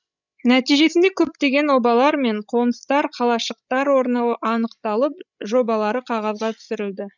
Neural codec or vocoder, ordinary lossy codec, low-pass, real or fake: codec, 16 kHz, 16 kbps, FreqCodec, larger model; none; 7.2 kHz; fake